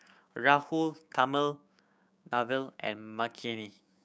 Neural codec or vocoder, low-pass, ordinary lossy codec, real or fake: codec, 16 kHz, 6 kbps, DAC; none; none; fake